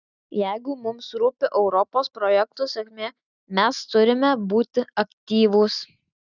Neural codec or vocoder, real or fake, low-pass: none; real; 7.2 kHz